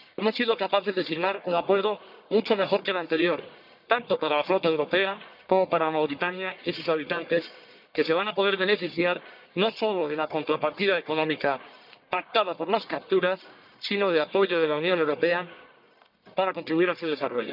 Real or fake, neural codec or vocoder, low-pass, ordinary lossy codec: fake; codec, 44.1 kHz, 1.7 kbps, Pupu-Codec; 5.4 kHz; none